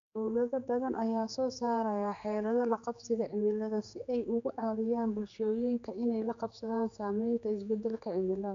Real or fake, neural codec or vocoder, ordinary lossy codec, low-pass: fake; codec, 16 kHz, 4 kbps, X-Codec, HuBERT features, trained on general audio; AAC, 64 kbps; 7.2 kHz